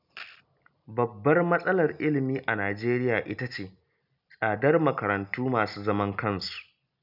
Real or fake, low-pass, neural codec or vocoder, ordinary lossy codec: real; 5.4 kHz; none; none